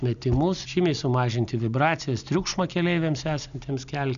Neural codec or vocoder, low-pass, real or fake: none; 7.2 kHz; real